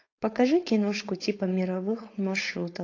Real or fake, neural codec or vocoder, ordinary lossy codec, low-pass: fake; codec, 16 kHz, 4.8 kbps, FACodec; AAC, 32 kbps; 7.2 kHz